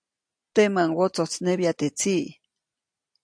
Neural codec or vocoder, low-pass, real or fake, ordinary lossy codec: none; 9.9 kHz; real; AAC, 64 kbps